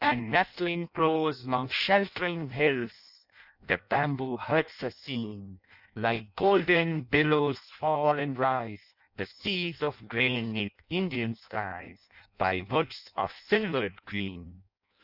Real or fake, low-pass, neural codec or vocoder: fake; 5.4 kHz; codec, 16 kHz in and 24 kHz out, 0.6 kbps, FireRedTTS-2 codec